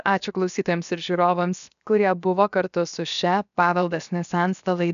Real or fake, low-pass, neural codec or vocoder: fake; 7.2 kHz; codec, 16 kHz, 0.7 kbps, FocalCodec